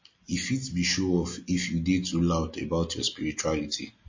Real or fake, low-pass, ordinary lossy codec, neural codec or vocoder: real; 7.2 kHz; MP3, 32 kbps; none